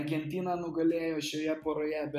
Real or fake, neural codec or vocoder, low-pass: real; none; 14.4 kHz